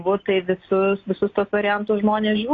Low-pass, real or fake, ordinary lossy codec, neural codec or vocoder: 7.2 kHz; real; AAC, 32 kbps; none